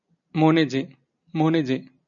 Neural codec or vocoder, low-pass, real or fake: none; 7.2 kHz; real